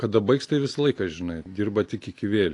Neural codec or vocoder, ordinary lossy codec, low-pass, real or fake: none; AAC, 48 kbps; 10.8 kHz; real